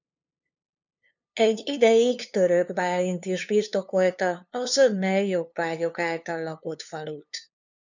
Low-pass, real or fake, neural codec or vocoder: 7.2 kHz; fake; codec, 16 kHz, 2 kbps, FunCodec, trained on LibriTTS, 25 frames a second